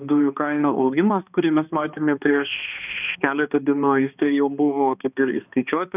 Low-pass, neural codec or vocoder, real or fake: 3.6 kHz; codec, 16 kHz, 2 kbps, X-Codec, HuBERT features, trained on balanced general audio; fake